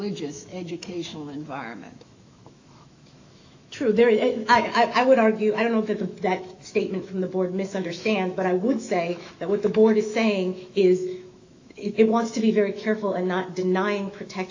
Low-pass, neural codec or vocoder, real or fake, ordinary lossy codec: 7.2 kHz; autoencoder, 48 kHz, 128 numbers a frame, DAC-VAE, trained on Japanese speech; fake; AAC, 48 kbps